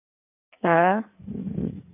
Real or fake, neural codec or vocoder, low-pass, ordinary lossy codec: fake; codec, 16 kHz in and 24 kHz out, 1.1 kbps, FireRedTTS-2 codec; 3.6 kHz; none